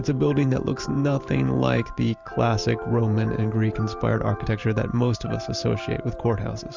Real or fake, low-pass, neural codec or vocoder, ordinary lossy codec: fake; 7.2 kHz; vocoder, 44.1 kHz, 128 mel bands every 512 samples, BigVGAN v2; Opus, 32 kbps